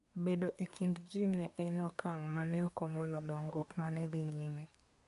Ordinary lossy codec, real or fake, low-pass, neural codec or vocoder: none; fake; 10.8 kHz; codec, 24 kHz, 1 kbps, SNAC